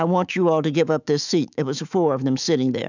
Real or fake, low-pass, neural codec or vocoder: fake; 7.2 kHz; autoencoder, 48 kHz, 128 numbers a frame, DAC-VAE, trained on Japanese speech